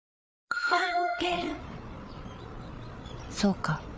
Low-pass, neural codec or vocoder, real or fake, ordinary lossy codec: none; codec, 16 kHz, 8 kbps, FreqCodec, larger model; fake; none